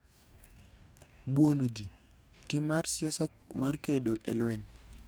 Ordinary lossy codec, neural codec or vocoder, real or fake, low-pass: none; codec, 44.1 kHz, 2.6 kbps, DAC; fake; none